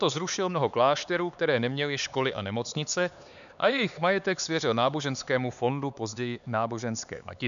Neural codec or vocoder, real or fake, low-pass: codec, 16 kHz, 4 kbps, X-Codec, HuBERT features, trained on LibriSpeech; fake; 7.2 kHz